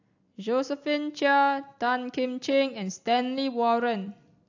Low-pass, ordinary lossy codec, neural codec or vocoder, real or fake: 7.2 kHz; AAC, 48 kbps; none; real